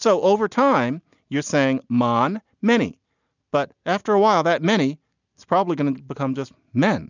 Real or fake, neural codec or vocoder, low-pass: real; none; 7.2 kHz